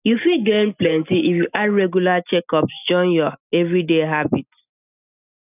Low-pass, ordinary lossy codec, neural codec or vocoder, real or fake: 3.6 kHz; none; none; real